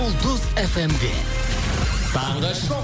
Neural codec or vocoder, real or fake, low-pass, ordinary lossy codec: none; real; none; none